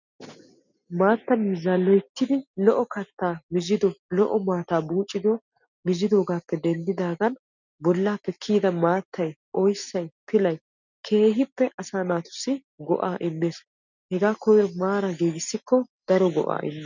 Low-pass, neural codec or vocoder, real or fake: 7.2 kHz; none; real